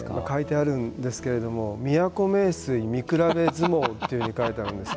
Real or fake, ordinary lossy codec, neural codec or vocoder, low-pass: real; none; none; none